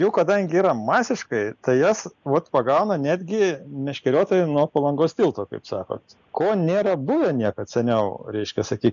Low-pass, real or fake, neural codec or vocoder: 7.2 kHz; real; none